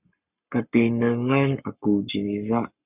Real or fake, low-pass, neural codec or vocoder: fake; 3.6 kHz; codec, 44.1 kHz, 7.8 kbps, Pupu-Codec